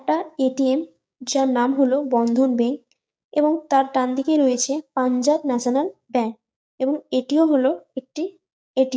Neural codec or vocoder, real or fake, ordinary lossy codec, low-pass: codec, 16 kHz, 6 kbps, DAC; fake; none; none